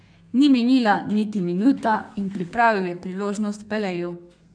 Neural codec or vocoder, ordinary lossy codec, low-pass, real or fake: codec, 32 kHz, 1.9 kbps, SNAC; none; 9.9 kHz; fake